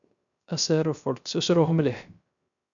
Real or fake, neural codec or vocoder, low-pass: fake; codec, 16 kHz, 0.3 kbps, FocalCodec; 7.2 kHz